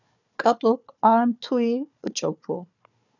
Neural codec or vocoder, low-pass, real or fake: codec, 16 kHz, 4 kbps, FunCodec, trained on Chinese and English, 50 frames a second; 7.2 kHz; fake